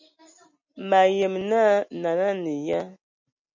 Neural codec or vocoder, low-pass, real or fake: none; 7.2 kHz; real